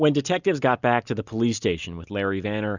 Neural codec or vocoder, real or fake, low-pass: none; real; 7.2 kHz